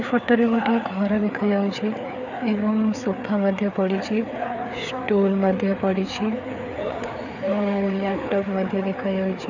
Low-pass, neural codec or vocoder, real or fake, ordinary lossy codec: 7.2 kHz; codec, 16 kHz, 4 kbps, FreqCodec, larger model; fake; none